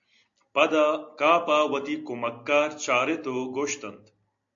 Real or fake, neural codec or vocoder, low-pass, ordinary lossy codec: real; none; 7.2 kHz; AAC, 64 kbps